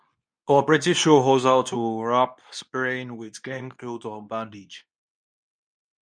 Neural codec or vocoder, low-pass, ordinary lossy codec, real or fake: codec, 24 kHz, 0.9 kbps, WavTokenizer, medium speech release version 2; 9.9 kHz; none; fake